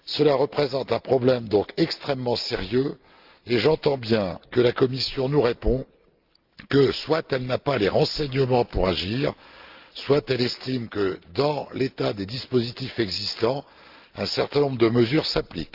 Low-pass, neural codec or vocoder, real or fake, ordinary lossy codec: 5.4 kHz; none; real; Opus, 32 kbps